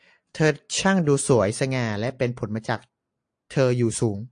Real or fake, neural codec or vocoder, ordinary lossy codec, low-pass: real; none; AAC, 64 kbps; 9.9 kHz